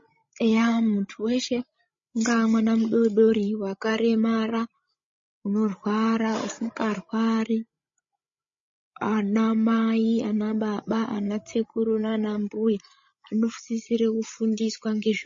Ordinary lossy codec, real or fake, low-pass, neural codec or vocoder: MP3, 32 kbps; fake; 7.2 kHz; codec, 16 kHz, 16 kbps, FreqCodec, larger model